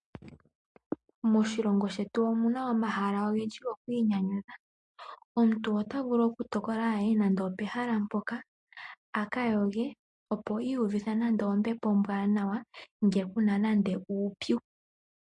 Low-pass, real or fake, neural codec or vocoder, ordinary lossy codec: 10.8 kHz; real; none; MP3, 48 kbps